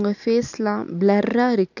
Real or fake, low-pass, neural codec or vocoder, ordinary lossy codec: real; 7.2 kHz; none; none